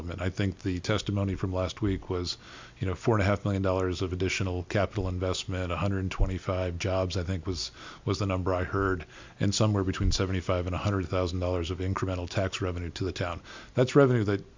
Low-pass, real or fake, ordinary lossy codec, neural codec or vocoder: 7.2 kHz; real; MP3, 64 kbps; none